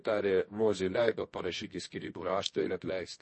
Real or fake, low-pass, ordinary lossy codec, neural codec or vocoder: fake; 10.8 kHz; MP3, 32 kbps; codec, 24 kHz, 0.9 kbps, WavTokenizer, medium music audio release